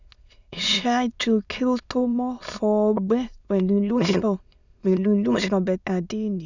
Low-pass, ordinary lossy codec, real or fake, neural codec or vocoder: 7.2 kHz; none; fake; autoencoder, 22.05 kHz, a latent of 192 numbers a frame, VITS, trained on many speakers